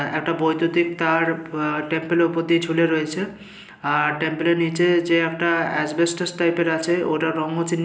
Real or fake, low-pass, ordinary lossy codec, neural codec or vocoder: real; none; none; none